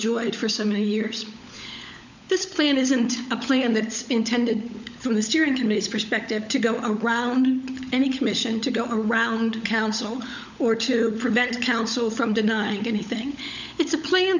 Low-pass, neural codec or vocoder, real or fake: 7.2 kHz; codec, 16 kHz, 16 kbps, FunCodec, trained on LibriTTS, 50 frames a second; fake